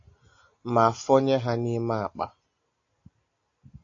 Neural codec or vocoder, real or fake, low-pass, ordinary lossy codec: none; real; 7.2 kHz; AAC, 48 kbps